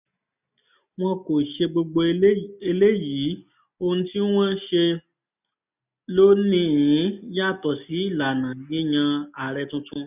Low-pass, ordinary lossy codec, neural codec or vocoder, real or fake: 3.6 kHz; none; none; real